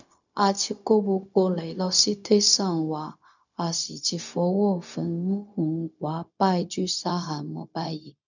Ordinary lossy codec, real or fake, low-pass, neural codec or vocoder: none; fake; 7.2 kHz; codec, 16 kHz, 0.4 kbps, LongCat-Audio-Codec